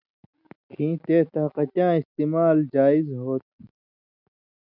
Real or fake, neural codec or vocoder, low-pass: real; none; 5.4 kHz